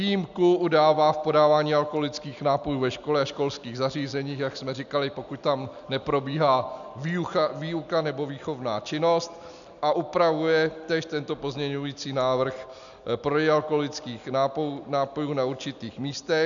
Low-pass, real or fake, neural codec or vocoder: 7.2 kHz; real; none